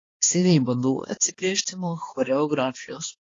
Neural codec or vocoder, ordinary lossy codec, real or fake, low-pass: codec, 16 kHz, 2 kbps, X-Codec, HuBERT features, trained on balanced general audio; AAC, 32 kbps; fake; 7.2 kHz